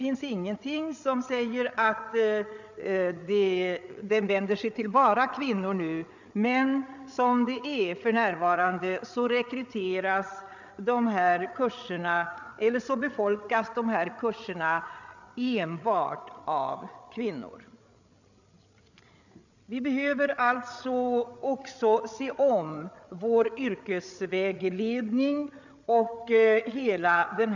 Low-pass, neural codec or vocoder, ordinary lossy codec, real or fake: 7.2 kHz; codec, 16 kHz, 8 kbps, FreqCodec, larger model; none; fake